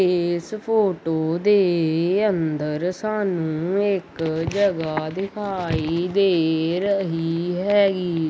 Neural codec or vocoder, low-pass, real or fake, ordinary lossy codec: none; none; real; none